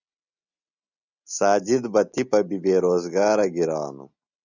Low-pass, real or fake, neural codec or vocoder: 7.2 kHz; real; none